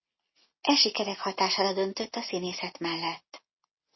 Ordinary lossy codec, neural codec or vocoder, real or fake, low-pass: MP3, 24 kbps; none; real; 7.2 kHz